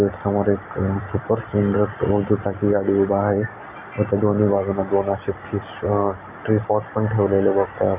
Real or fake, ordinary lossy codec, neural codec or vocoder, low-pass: real; none; none; 3.6 kHz